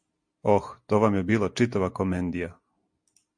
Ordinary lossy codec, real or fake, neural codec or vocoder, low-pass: MP3, 48 kbps; real; none; 9.9 kHz